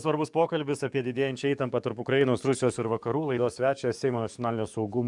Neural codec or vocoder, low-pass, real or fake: codec, 44.1 kHz, 7.8 kbps, DAC; 10.8 kHz; fake